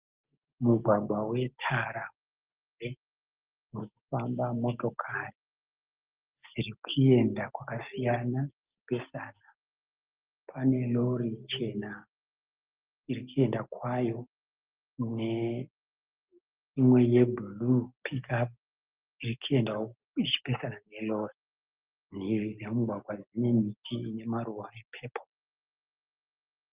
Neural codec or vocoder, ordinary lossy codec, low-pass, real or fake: none; Opus, 32 kbps; 3.6 kHz; real